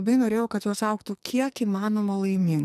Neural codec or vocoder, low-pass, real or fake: codec, 44.1 kHz, 2.6 kbps, SNAC; 14.4 kHz; fake